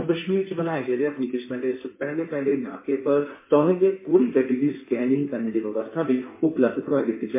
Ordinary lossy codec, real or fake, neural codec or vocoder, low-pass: MP3, 24 kbps; fake; codec, 16 kHz in and 24 kHz out, 1.1 kbps, FireRedTTS-2 codec; 3.6 kHz